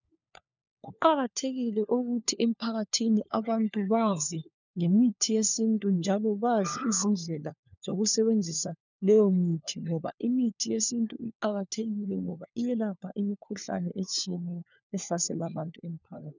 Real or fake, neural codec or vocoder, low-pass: fake; codec, 16 kHz, 4 kbps, FunCodec, trained on LibriTTS, 50 frames a second; 7.2 kHz